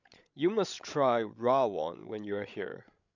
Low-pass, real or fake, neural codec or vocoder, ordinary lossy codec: 7.2 kHz; fake; codec, 16 kHz, 16 kbps, FreqCodec, larger model; none